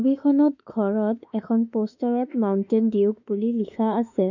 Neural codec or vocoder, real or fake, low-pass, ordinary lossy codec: autoencoder, 48 kHz, 32 numbers a frame, DAC-VAE, trained on Japanese speech; fake; 7.2 kHz; none